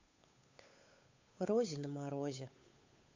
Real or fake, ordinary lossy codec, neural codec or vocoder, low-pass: fake; MP3, 48 kbps; codec, 16 kHz, 8 kbps, FunCodec, trained on Chinese and English, 25 frames a second; 7.2 kHz